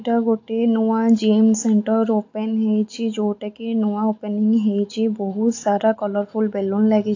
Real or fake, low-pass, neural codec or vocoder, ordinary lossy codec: real; 7.2 kHz; none; AAC, 48 kbps